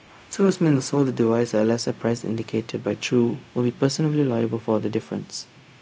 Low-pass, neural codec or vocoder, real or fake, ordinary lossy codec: none; codec, 16 kHz, 0.4 kbps, LongCat-Audio-Codec; fake; none